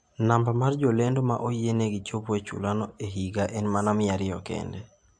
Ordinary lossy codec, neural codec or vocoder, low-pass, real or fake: AAC, 64 kbps; none; 9.9 kHz; real